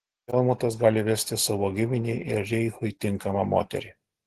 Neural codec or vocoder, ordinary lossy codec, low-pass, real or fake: none; Opus, 16 kbps; 14.4 kHz; real